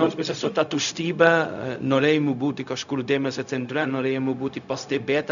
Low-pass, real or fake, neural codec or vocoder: 7.2 kHz; fake; codec, 16 kHz, 0.4 kbps, LongCat-Audio-Codec